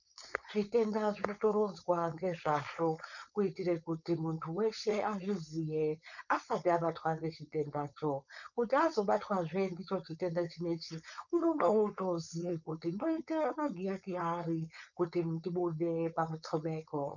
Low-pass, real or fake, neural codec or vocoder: 7.2 kHz; fake; codec, 16 kHz, 4.8 kbps, FACodec